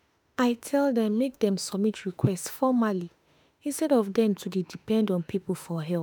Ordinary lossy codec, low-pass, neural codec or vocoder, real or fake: none; none; autoencoder, 48 kHz, 32 numbers a frame, DAC-VAE, trained on Japanese speech; fake